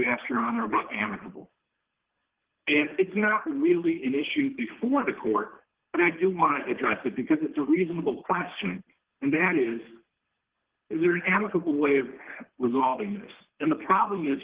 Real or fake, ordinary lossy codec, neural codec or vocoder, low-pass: fake; Opus, 16 kbps; codec, 24 kHz, 3 kbps, HILCodec; 3.6 kHz